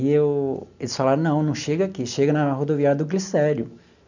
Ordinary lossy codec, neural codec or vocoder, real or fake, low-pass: none; none; real; 7.2 kHz